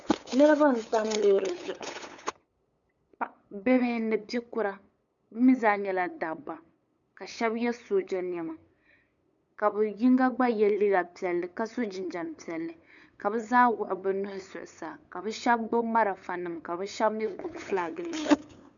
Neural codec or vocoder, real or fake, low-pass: codec, 16 kHz, 8 kbps, FunCodec, trained on LibriTTS, 25 frames a second; fake; 7.2 kHz